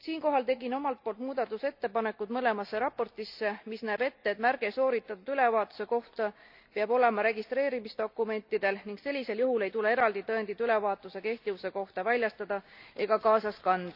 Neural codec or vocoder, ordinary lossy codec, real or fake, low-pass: none; none; real; 5.4 kHz